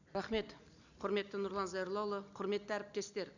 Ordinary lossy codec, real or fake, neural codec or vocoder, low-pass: none; real; none; 7.2 kHz